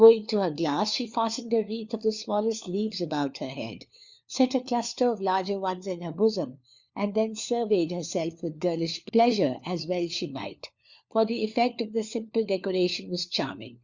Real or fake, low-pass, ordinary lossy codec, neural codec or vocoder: fake; 7.2 kHz; Opus, 64 kbps; codec, 16 kHz, 4 kbps, FunCodec, trained on LibriTTS, 50 frames a second